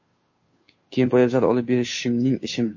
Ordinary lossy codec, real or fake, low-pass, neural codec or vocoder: MP3, 32 kbps; fake; 7.2 kHz; codec, 16 kHz, 2 kbps, FunCodec, trained on Chinese and English, 25 frames a second